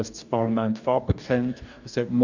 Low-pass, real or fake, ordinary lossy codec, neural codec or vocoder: 7.2 kHz; fake; none; codec, 44.1 kHz, 2.6 kbps, DAC